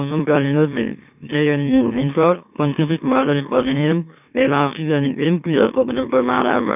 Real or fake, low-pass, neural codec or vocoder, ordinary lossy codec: fake; 3.6 kHz; autoencoder, 44.1 kHz, a latent of 192 numbers a frame, MeloTTS; none